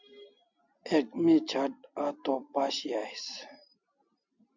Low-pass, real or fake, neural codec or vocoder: 7.2 kHz; real; none